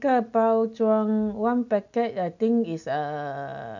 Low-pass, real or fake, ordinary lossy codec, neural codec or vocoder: 7.2 kHz; real; none; none